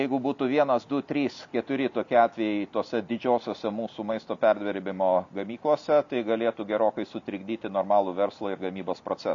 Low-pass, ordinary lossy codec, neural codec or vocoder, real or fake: 7.2 kHz; MP3, 48 kbps; none; real